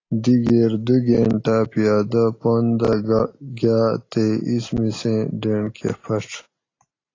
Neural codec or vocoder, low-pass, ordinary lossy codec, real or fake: none; 7.2 kHz; AAC, 32 kbps; real